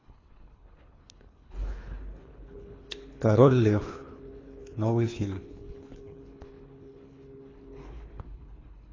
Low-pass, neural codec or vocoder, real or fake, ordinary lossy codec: 7.2 kHz; codec, 24 kHz, 3 kbps, HILCodec; fake; AAC, 32 kbps